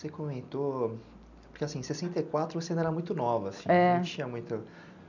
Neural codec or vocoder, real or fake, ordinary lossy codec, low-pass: none; real; none; 7.2 kHz